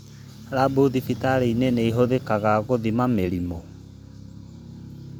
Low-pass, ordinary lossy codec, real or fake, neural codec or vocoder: none; none; fake; vocoder, 44.1 kHz, 128 mel bands every 512 samples, BigVGAN v2